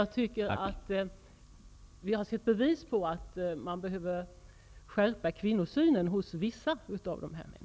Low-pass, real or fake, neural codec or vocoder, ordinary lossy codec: none; real; none; none